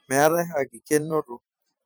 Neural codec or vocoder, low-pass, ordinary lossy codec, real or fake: vocoder, 44.1 kHz, 128 mel bands every 512 samples, BigVGAN v2; none; none; fake